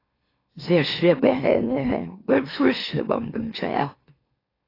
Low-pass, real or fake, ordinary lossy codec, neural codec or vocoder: 5.4 kHz; fake; AAC, 24 kbps; autoencoder, 44.1 kHz, a latent of 192 numbers a frame, MeloTTS